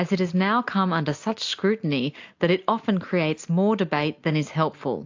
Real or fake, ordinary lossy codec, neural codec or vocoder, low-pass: real; AAC, 48 kbps; none; 7.2 kHz